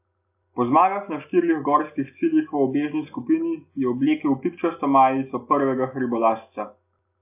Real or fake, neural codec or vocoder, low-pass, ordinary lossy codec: real; none; 3.6 kHz; AAC, 32 kbps